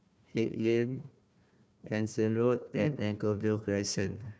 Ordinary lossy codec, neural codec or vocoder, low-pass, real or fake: none; codec, 16 kHz, 1 kbps, FunCodec, trained on Chinese and English, 50 frames a second; none; fake